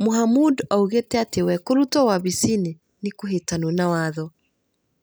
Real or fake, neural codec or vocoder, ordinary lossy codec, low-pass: real; none; none; none